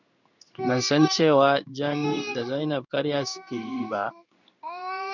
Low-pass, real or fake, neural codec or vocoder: 7.2 kHz; fake; codec, 16 kHz in and 24 kHz out, 1 kbps, XY-Tokenizer